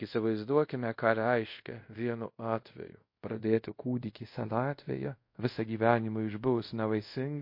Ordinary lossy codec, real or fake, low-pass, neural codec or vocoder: MP3, 32 kbps; fake; 5.4 kHz; codec, 24 kHz, 0.5 kbps, DualCodec